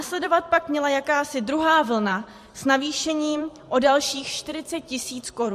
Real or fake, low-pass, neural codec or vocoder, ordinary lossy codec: fake; 14.4 kHz; vocoder, 44.1 kHz, 128 mel bands every 512 samples, BigVGAN v2; MP3, 64 kbps